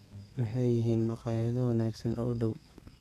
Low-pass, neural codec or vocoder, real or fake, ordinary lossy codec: 14.4 kHz; codec, 32 kHz, 1.9 kbps, SNAC; fake; none